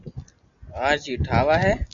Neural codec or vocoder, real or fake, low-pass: none; real; 7.2 kHz